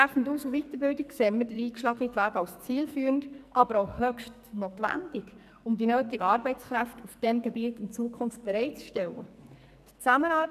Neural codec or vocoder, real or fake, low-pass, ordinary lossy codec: codec, 44.1 kHz, 2.6 kbps, SNAC; fake; 14.4 kHz; none